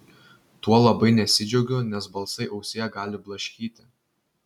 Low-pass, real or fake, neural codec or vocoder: 19.8 kHz; real; none